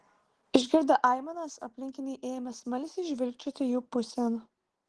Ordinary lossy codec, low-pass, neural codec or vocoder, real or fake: Opus, 16 kbps; 10.8 kHz; none; real